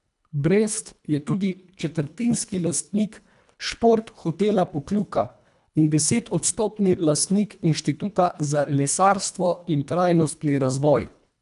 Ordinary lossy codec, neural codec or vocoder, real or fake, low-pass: none; codec, 24 kHz, 1.5 kbps, HILCodec; fake; 10.8 kHz